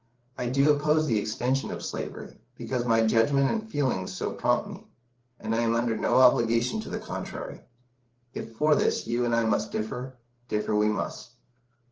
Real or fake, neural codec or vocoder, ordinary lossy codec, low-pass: fake; codec, 16 kHz, 8 kbps, FreqCodec, larger model; Opus, 16 kbps; 7.2 kHz